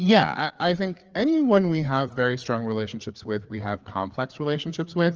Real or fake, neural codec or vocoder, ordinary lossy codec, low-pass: fake; codec, 16 kHz, 4 kbps, FreqCodec, larger model; Opus, 24 kbps; 7.2 kHz